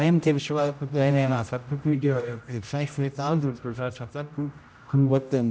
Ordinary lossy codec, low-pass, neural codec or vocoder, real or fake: none; none; codec, 16 kHz, 0.5 kbps, X-Codec, HuBERT features, trained on general audio; fake